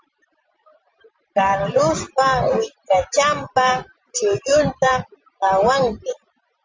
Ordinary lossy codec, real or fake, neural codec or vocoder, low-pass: Opus, 32 kbps; fake; vocoder, 44.1 kHz, 128 mel bands every 512 samples, BigVGAN v2; 7.2 kHz